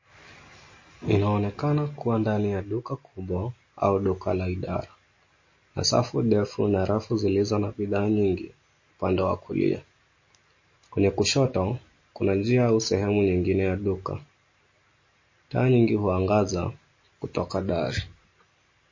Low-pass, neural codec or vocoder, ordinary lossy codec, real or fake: 7.2 kHz; none; MP3, 32 kbps; real